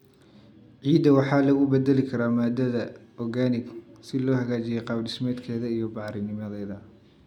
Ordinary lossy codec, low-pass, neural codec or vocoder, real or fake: none; 19.8 kHz; none; real